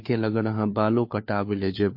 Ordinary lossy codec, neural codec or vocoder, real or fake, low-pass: MP3, 32 kbps; codec, 16 kHz, 4 kbps, FunCodec, trained on LibriTTS, 50 frames a second; fake; 5.4 kHz